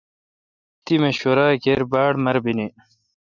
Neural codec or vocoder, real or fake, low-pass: none; real; 7.2 kHz